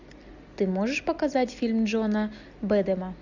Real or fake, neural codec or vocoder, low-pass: real; none; 7.2 kHz